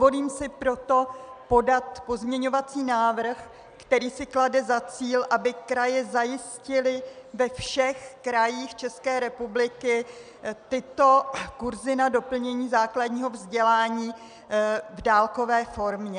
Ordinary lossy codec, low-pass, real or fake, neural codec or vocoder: MP3, 96 kbps; 9.9 kHz; real; none